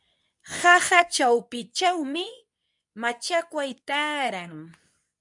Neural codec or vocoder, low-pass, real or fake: codec, 24 kHz, 0.9 kbps, WavTokenizer, medium speech release version 2; 10.8 kHz; fake